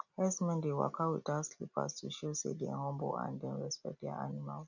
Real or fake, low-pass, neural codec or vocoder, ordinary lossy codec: real; 7.2 kHz; none; none